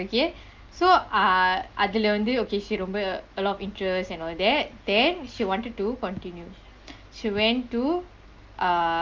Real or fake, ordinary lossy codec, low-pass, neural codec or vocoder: real; Opus, 32 kbps; 7.2 kHz; none